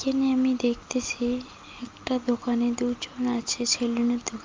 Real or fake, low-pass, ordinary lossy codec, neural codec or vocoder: real; 7.2 kHz; Opus, 32 kbps; none